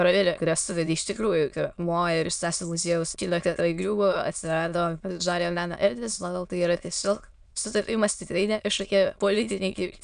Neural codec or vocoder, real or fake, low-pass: autoencoder, 22.05 kHz, a latent of 192 numbers a frame, VITS, trained on many speakers; fake; 9.9 kHz